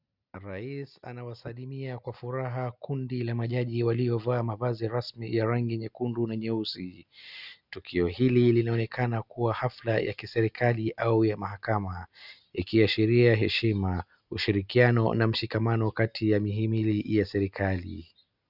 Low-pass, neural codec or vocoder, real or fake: 5.4 kHz; none; real